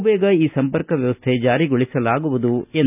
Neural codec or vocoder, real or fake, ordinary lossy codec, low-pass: none; real; none; 3.6 kHz